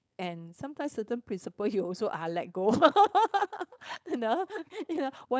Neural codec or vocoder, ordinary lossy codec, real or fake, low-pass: codec, 16 kHz, 4.8 kbps, FACodec; none; fake; none